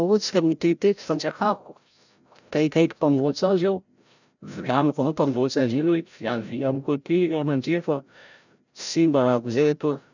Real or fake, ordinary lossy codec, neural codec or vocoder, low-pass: fake; none; codec, 16 kHz, 0.5 kbps, FreqCodec, larger model; 7.2 kHz